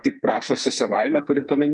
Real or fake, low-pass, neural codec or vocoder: fake; 10.8 kHz; codec, 44.1 kHz, 2.6 kbps, SNAC